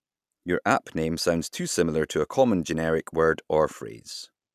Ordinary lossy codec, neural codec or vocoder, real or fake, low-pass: none; none; real; 14.4 kHz